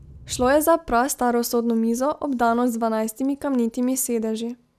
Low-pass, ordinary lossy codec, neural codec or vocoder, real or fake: 14.4 kHz; none; none; real